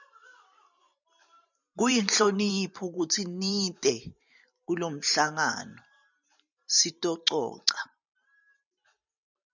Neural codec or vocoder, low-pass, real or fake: vocoder, 44.1 kHz, 128 mel bands every 512 samples, BigVGAN v2; 7.2 kHz; fake